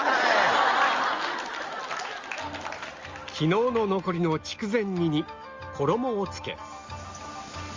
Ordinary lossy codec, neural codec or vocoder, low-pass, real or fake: Opus, 32 kbps; none; 7.2 kHz; real